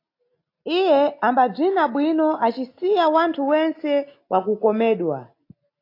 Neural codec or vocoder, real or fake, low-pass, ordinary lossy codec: none; real; 5.4 kHz; AAC, 32 kbps